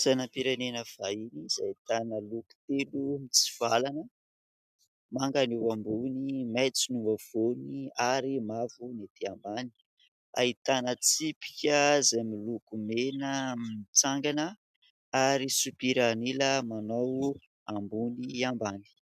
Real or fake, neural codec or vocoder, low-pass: real; none; 14.4 kHz